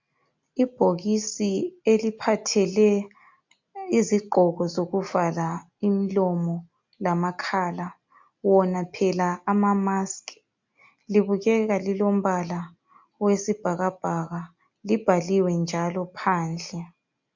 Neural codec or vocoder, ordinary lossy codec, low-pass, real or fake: none; MP3, 48 kbps; 7.2 kHz; real